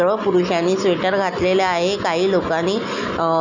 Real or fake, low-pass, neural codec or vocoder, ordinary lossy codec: real; 7.2 kHz; none; none